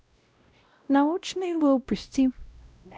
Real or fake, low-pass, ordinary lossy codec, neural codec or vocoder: fake; none; none; codec, 16 kHz, 0.5 kbps, X-Codec, WavLM features, trained on Multilingual LibriSpeech